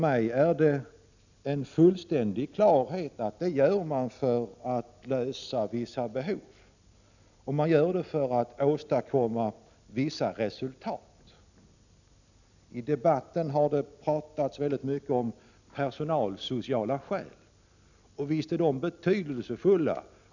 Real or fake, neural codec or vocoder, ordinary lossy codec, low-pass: real; none; none; 7.2 kHz